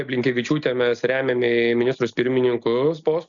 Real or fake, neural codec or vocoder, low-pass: real; none; 7.2 kHz